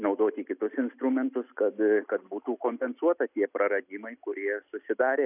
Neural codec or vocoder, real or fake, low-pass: none; real; 3.6 kHz